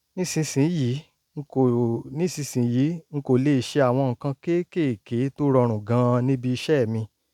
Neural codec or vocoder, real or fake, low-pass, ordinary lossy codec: none; real; 19.8 kHz; none